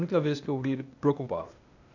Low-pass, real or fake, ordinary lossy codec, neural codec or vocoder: 7.2 kHz; fake; none; codec, 16 kHz, 0.8 kbps, ZipCodec